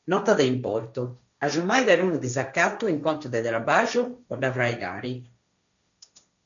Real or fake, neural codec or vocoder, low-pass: fake; codec, 16 kHz, 1.1 kbps, Voila-Tokenizer; 7.2 kHz